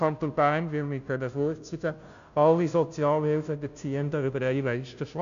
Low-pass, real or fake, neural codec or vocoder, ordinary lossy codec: 7.2 kHz; fake; codec, 16 kHz, 0.5 kbps, FunCodec, trained on Chinese and English, 25 frames a second; AAC, 96 kbps